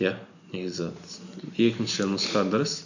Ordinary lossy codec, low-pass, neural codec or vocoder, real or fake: none; 7.2 kHz; none; real